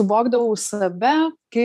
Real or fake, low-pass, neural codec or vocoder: fake; 14.4 kHz; vocoder, 44.1 kHz, 128 mel bands every 512 samples, BigVGAN v2